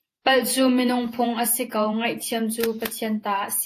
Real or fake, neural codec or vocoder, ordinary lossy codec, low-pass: fake; vocoder, 48 kHz, 128 mel bands, Vocos; AAC, 64 kbps; 14.4 kHz